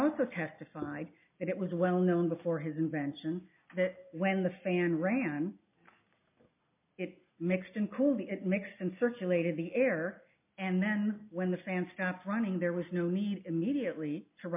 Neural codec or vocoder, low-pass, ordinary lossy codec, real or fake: none; 3.6 kHz; MP3, 32 kbps; real